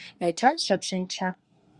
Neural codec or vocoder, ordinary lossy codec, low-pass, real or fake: codec, 24 kHz, 1 kbps, SNAC; Opus, 64 kbps; 10.8 kHz; fake